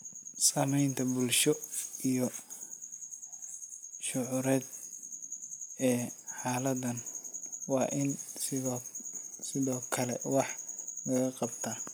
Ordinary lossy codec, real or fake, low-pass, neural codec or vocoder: none; real; none; none